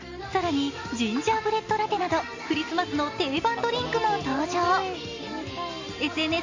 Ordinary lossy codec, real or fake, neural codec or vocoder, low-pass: AAC, 48 kbps; real; none; 7.2 kHz